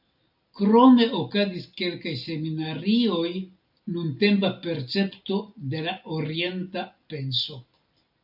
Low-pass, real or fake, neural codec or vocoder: 5.4 kHz; real; none